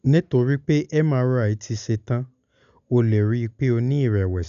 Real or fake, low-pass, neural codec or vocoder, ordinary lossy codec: real; 7.2 kHz; none; none